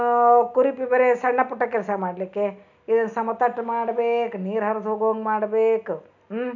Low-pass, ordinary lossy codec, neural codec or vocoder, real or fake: 7.2 kHz; none; none; real